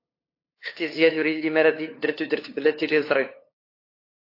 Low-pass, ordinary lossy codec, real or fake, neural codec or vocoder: 5.4 kHz; AAC, 32 kbps; fake; codec, 16 kHz, 2 kbps, FunCodec, trained on LibriTTS, 25 frames a second